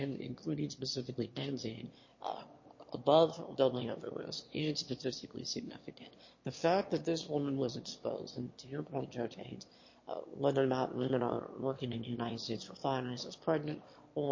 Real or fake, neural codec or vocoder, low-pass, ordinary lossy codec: fake; autoencoder, 22.05 kHz, a latent of 192 numbers a frame, VITS, trained on one speaker; 7.2 kHz; MP3, 32 kbps